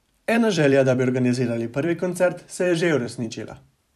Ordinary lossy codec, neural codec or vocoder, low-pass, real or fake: none; vocoder, 44.1 kHz, 128 mel bands every 256 samples, BigVGAN v2; 14.4 kHz; fake